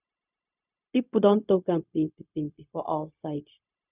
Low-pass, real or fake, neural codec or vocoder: 3.6 kHz; fake; codec, 16 kHz, 0.4 kbps, LongCat-Audio-Codec